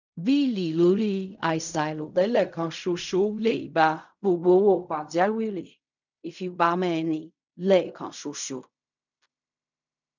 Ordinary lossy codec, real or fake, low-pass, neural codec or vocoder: none; fake; 7.2 kHz; codec, 16 kHz in and 24 kHz out, 0.4 kbps, LongCat-Audio-Codec, fine tuned four codebook decoder